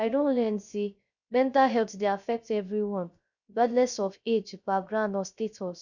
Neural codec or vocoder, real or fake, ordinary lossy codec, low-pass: codec, 16 kHz, 0.3 kbps, FocalCodec; fake; none; 7.2 kHz